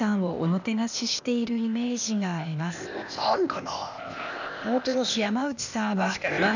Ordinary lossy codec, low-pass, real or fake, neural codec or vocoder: none; 7.2 kHz; fake; codec, 16 kHz, 0.8 kbps, ZipCodec